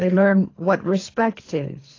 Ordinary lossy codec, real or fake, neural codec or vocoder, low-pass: AAC, 32 kbps; fake; codec, 24 kHz, 3 kbps, HILCodec; 7.2 kHz